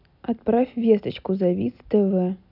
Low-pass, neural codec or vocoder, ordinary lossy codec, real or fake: 5.4 kHz; none; none; real